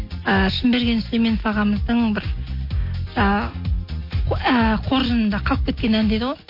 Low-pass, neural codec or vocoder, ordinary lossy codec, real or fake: 5.4 kHz; none; MP3, 32 kbps; real